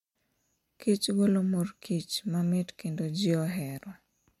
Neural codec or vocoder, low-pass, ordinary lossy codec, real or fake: none; 19.8 kHz; MP3, 64 kbps; real